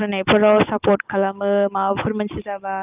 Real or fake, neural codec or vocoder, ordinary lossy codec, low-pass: real; none; Opus, 24 kbps; 3.6 kHz